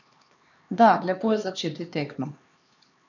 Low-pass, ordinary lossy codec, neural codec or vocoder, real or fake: 7.2 kHz; none; codec, 16 kHz, 2 kbps, X-Codec, HuBERT features, trained on LibriSpeech; fake